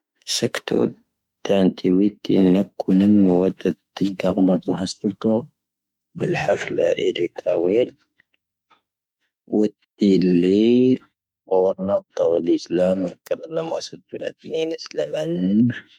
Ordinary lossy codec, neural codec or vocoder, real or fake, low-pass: MP3, 96 kbps; autoencoder, 48 kHz, 32 numbers a frame, DAC-VAE, trained on Japanese speech; fake; 19.8 kHz